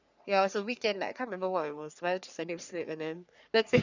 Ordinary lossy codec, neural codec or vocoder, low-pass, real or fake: none; codec, 44.1 kHz, 3.4 kbps, Pupu-Codec; 7.2 kHz; fake